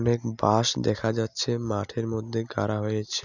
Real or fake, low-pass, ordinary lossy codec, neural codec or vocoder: real; 7.2 kHz; none; none